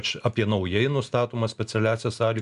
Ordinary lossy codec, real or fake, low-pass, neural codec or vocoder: MP3, 96 kbps; real; 10.8 kHz; none